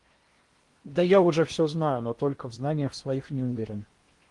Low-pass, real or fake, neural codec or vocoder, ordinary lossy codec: 10.8 kHz; fake; codec, 16 kHz in and 24 kHz out, 0.8 kbps, FocalCodec, streaming, 65536 codes; Opus, 24 kbps